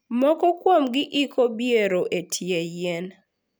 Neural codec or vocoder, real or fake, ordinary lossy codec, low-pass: none; real; none; none